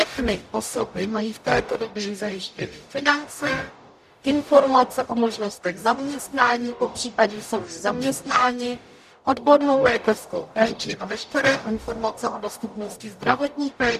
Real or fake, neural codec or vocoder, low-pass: fake; codec, 44.1 kHz, 0.9 kbps, DAC; 14.4 kHz